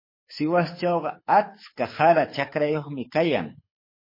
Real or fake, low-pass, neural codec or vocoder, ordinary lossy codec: fake; 5.4 kHz; codec, 24 kHz, 6 kbps, HILCodec; MP3, 24 kbps